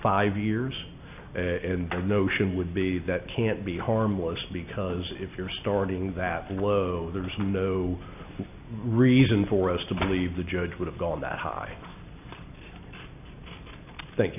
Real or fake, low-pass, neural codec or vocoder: real; 3.6 kHz; none